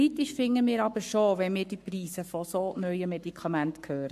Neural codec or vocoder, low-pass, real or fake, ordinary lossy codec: codec, 44.1 kHz, 7.8 kbps, Pupu-Codec; 14.4 kHz; fake; MP3, 64 kbps